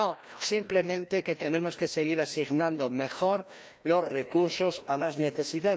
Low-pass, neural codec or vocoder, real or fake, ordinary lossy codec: none; codec, 16 kHz, 1 kbps, FreqCodec, larger model; fake; none